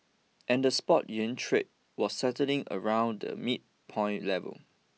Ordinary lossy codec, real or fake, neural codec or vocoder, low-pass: none; real; none; none